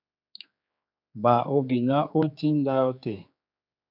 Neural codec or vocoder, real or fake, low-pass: codec, 16 kHz, 4 kbps, X-Codec, HuBERT features, trained on general audio; fake; 5.4 kHz